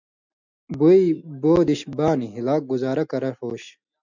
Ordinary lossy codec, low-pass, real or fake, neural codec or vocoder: MP3, 64 kbps; 7.2 kHz; real; none